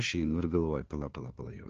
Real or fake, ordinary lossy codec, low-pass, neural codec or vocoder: fake; Opus, 16 kbps; 7.2 kHz; codec, 16 kHz, 2 kbps, FunCodec, trained on LibriTTS, 25 frames a second